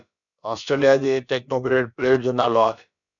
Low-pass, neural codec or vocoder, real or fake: 7.2 kHz; codec, 16 kHz, about 1 kbps, DyCAST, with the encoder's durations; fake